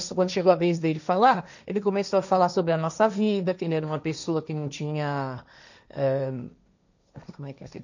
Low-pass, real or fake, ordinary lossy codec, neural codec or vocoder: 7.2 kHz; fake; none; codec, 16 kHz, 1.1 kbps, Voila-Tokenizer